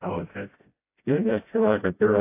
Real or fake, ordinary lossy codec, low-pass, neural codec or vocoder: fake; AAC, 24 kbps; 3.6 kHz; codec, 16 kHz, 0.5 kbps, FreqCodec, smaller model